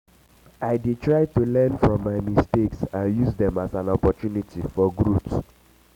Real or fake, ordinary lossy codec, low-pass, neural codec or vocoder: real; none; 19.8 kHz; none